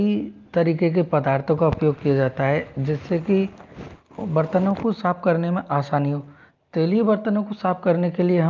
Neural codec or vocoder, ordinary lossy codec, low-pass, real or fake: none; Opus, 24 kbps; 7.2 kHz; real